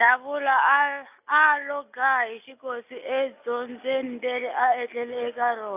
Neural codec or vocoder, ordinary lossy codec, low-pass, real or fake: none; AAC, 32 kbps; 3.6 kHz; real